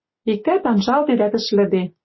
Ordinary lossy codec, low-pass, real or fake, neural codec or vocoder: MP3, 24 kbps; 7.2 kHz; real; none